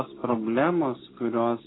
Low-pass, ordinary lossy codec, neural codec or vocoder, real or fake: 7.2 kHz; AAC, 16 kbps; none; real